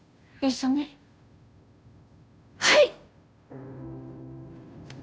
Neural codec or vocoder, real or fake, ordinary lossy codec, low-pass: codec, 16 kHz, 0.5 kbps, FunCodec, trained on Chinese and English, 25 frames a second; fake; none; none